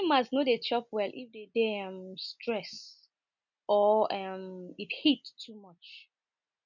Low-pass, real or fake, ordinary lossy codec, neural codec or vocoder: 7.2 kHz; real; none; none